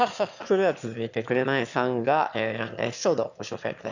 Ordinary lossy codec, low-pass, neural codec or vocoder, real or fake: none; 7.2 kHz; autoencoder, 22.05 kHz, a latent of 192 numbers a frame, VITS, trained on one speaker; fake